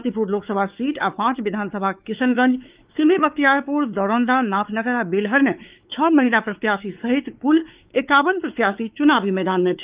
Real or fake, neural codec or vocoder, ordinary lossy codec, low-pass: fake; codec, 16 kHz, 4 kbps, X-Codec, WavLM features, trained on Multilingual LibriSpeech; Opus, 32 kbps; 3.6 kHz